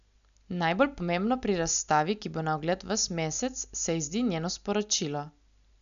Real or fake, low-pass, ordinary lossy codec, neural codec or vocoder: real; 7.2 kHz; none; none